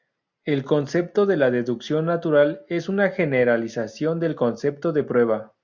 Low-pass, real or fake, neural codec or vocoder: 7.2 kHz; real; none